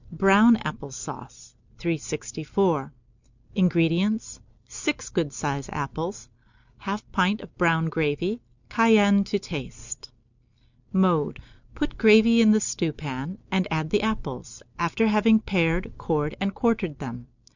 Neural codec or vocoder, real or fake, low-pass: none; real; 7.2 kHz